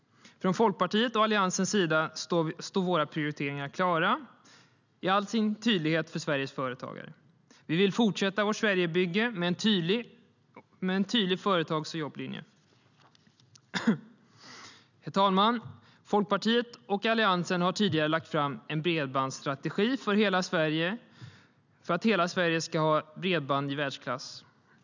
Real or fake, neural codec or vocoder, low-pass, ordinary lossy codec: real; none; 7.2 kHz; none